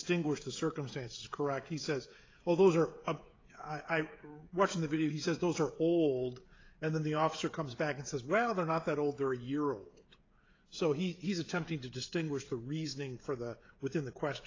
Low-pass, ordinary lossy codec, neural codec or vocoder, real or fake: 7.2 kHz; AAC, 32 kbps; codec, 16 kHz, 16 kbps, FreqCodec, smaller model; fake